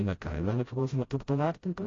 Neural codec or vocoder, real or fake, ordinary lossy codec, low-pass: codec, 16 kHz, 0.5 kbps, FreqCodec, smaller model; fake; AAC, 32 kbps; 7.2 kHz